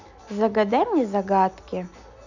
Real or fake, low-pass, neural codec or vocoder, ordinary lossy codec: real; 7.2 kHz; none; none